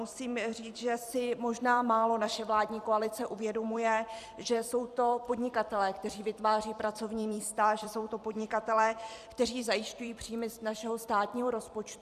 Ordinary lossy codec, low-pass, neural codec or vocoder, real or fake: Opus, 64 kbps; 14.4 kHz; vocoder, 44.1 kHz, 128 mel bands every 256 samples, BigVGAN v2; fake